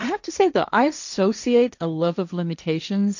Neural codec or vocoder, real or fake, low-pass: codec, 16 kHz, 1.1 kbps, Voila-Tokenizer; fake; 7.2 kHz